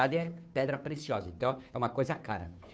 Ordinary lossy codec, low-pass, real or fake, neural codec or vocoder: none; none; fake; codec, 16 kHz, 2 kbps, FunCodec, trained on Chinese and English, 25 frames a second